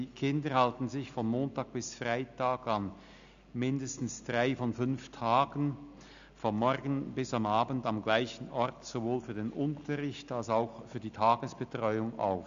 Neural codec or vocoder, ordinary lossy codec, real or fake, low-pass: none; AAC, 96 kbps; real; 7.2 kHz